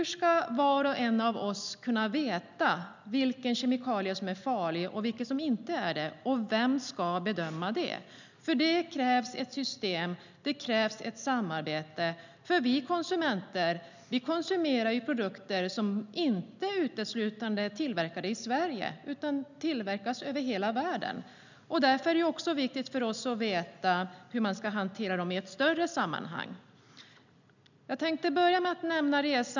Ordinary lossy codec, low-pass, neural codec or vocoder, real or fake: none; 7.2 kHz; none; real